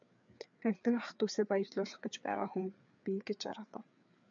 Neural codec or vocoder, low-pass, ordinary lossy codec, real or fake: codec, 16 kHz, 4 kbps, FreqCodec, larger model; 7.2 kHz; MP3, 64 kbps; fake